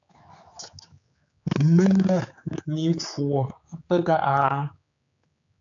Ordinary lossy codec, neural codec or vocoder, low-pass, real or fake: AAC, 64 kbps; codec, 16 kHz, 4 kbps, X-Codec, HuBERT features, trained on general audio; 7.2 kHz; fake